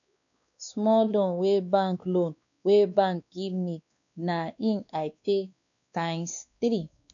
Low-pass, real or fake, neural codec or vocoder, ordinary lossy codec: 7.2 kHz; fake; codec, 16 kHz, 2 kbps, X-Codec, WavLM features, trained on Multilingual LibriSpeech; none